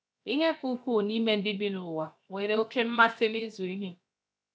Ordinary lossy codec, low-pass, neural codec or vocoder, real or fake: none; none; codec, 16 kHz, 0.7 kbps, FocalCodec; fake